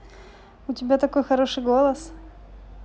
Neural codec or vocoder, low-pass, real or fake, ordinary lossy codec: none; none; real; none